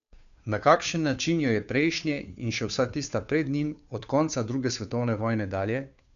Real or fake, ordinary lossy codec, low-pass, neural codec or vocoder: fake; none; 7.2 kHz; codec, 16 kHz, 2 kbps, FunCodec, trained on Chinese and English, 25 frames a second